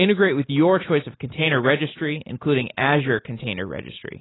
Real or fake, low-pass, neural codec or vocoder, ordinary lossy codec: real; 7.2 kHz; none; AAC, 16 kbps